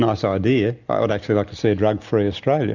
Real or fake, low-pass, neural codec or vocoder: real; 7.2 kHz; none